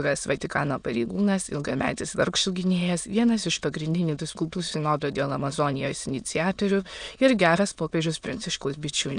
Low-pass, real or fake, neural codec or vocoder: 9.9 kHz; fake; autoencoder, 22.05 kHz, a latent of 192 numbers a frame, VITS, trained on many speakers